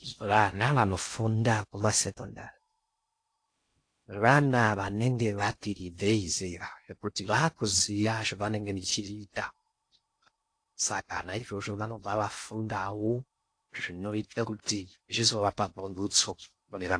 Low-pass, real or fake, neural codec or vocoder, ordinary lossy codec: 9.9 kHz; fake; codec, 16 kHz in and 24 kHz out, 0.6 kbps, FocalCodec, streaming, 2048 codes; AAC, 48 kbps